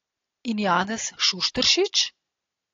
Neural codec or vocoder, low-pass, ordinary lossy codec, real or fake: none; 7.2 kHz; AAC, 32 kbps; real